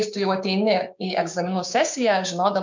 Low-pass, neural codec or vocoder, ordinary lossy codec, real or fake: 7.2 kHz; codec, 16 kHz, 6 kbps, DAC; MP3, 64 kbps; fake